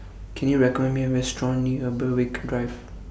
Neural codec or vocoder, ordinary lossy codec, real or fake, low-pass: none; none; real; none